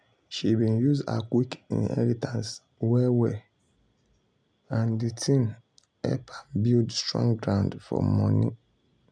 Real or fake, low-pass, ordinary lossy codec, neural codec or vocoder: real; 9.9 kHz; none; none